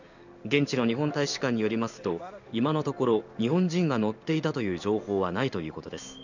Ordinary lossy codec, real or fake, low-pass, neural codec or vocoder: none; fake; 7.2 kHz; codec, 16 kHz in and 24 kHz out, 1 kbps, XY-Tokenizer